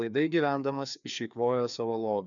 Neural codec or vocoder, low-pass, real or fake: codec, 16 kHz, 2 kbps, FreqCodec, larger model; 7.2 kHz; fake